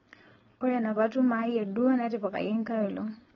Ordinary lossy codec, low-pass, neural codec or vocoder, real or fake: AAC, 24 kbps; 7.2 kHz; codec, 16 kHz, 4.8 kbps, FACodec; fake